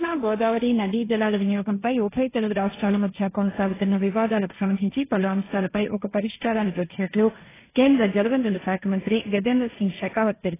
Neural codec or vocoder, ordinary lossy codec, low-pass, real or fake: codec, 16 kHz, 1.1 kbps, Voila-Tokenizer; AAC, 16 kbps; 3.6 kHz; fake